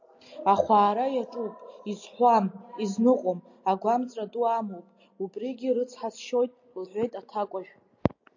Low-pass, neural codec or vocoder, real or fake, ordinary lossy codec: 7.2 kHz; none; real; AAC, 48 kbps